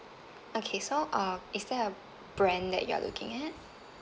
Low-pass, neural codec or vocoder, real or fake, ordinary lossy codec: none; none; real; none